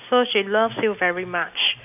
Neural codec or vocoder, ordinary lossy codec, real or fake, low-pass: none; none; real; 3.6 kHz